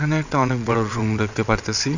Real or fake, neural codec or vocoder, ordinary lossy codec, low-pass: fake; vocoder, 22.05 kHz, 80 mel bands, WaveNeXt; none; 7.2 kHz